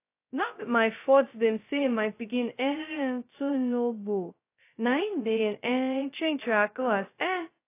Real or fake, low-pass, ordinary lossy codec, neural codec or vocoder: fake; 3.6 kHz; AAC, 24 kbps; codec, 16 kHz, 0.2 kbps, FocalCodec